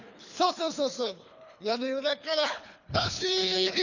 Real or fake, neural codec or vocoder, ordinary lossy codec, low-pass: fake; codec, 24 kHz, 3 kbps, HILCodec; none; 7.2 kHz